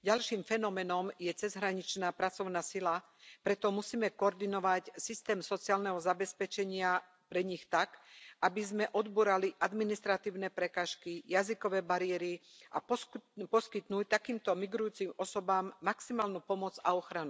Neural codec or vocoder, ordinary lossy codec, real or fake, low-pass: none; none; real; none